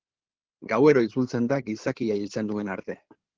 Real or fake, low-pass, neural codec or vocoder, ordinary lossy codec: fake; 7.2 kHz; codec, 16 kHz in and 24 kHz out, 2.2 kbps, FireRedTTS-2 codec; Opus, 16 kbps